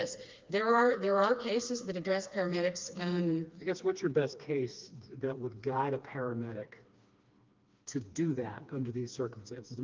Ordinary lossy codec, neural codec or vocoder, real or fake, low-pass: Opus, 24 kbps; codec, 16 kHz, 2 kbps, FreqCodec, smaller model; fake; 7.2 kHz